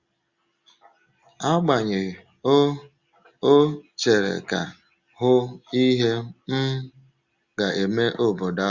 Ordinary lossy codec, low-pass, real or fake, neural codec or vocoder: Opus, 64 kbps; 7.2 kHz; real; none